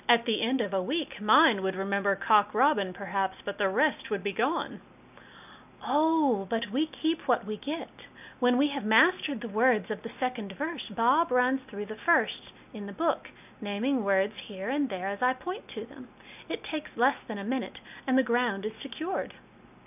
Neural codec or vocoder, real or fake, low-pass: none; real; 3.6 kHz